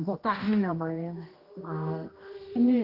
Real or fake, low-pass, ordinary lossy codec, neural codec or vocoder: fake; 5.4 kHz; Opus, 24 kbps; codec, 16 kHz, 1 kbps, X-Codec, HuBERT features, trained on general audio